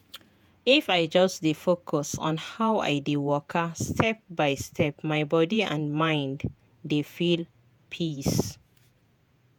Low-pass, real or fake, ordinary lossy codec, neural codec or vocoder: none; fake; none; vocoder, 48 kHz, 128 mel bands, Vocos